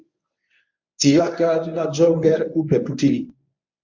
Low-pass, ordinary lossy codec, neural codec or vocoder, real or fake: 7.2 kHz; MP3, 64 kbps; codec, 24 kHz, 0.9 kbps, WavTokenizer, medium speech release version 1; fake